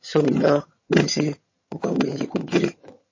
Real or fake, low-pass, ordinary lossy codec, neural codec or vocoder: fake; 7.2 kHz; MP3, 32 kbps; vocoder, 22.05 kHz, 80 mel bands, HiFi-GAN